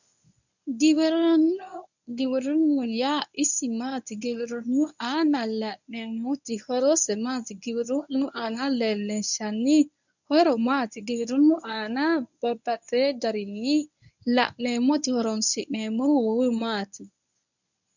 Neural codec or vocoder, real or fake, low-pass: codec, 24 kHz, 0.9 kbps, WavTokenizer, medium speech release version 1; fake; 7.2 kHz